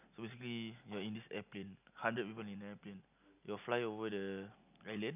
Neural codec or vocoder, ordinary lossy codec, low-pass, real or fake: none; none; 3.6 kHz; real